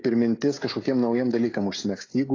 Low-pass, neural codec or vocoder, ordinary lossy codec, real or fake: 7.2 kHz; codec, 16 kHz, 16 kbps, FreqCodec, smaller model; AAC, 32 kbps; fake